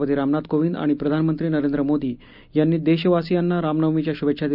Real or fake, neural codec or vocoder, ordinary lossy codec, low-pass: real; none; none; 5.4 kHz